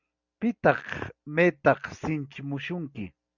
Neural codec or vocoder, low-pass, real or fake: none; 7.2 kHz; real